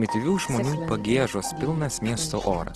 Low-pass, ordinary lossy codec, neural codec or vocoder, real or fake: 10.8 kHz; Opus, 16 kbps; none; real